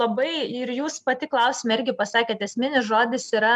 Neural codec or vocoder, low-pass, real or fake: none; 10.8 kHz; real